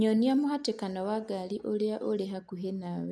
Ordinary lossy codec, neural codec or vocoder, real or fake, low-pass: none; none; real; none